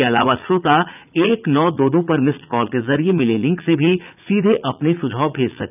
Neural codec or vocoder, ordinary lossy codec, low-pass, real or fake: vocoder, 44.1 kHz, 80 mel bands, Vocos; none; 3.6 kHz; fake